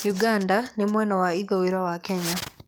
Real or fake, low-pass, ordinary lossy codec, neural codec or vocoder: fake; none; none; codec, 44.1 kHz, 7.8 kbps, DAC